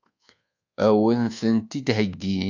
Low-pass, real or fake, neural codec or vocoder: 7.2 kHz; fake; codec, 24 kHz, 1.2 kbps, DualCodec